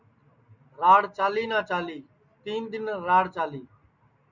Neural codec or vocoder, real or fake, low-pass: none; real; 7.2 kHz